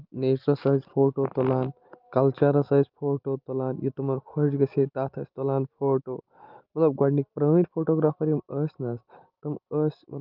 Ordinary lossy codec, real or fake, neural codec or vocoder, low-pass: Opus, 32 kbps; real; none; 5.4 kHz